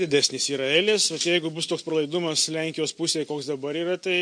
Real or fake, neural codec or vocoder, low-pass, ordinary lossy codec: real; none; 9.9 kHz; MP3, 48 kbps